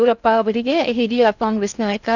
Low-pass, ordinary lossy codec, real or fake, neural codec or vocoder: 7.2 kHz; none; fake; codec, 16 kHz in and 24 kHz out, 0.6 kbps, FocalCodec, streaming, 4096 codes